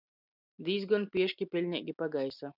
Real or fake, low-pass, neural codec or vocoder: real; 5.4 kHz; none